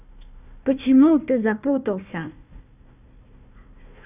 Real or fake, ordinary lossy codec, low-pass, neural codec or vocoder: fake; none; 3.6 kHz; codec, 16 kHz, 1 kbps, FunCodec, trained on Chinese and English, 50 frames a second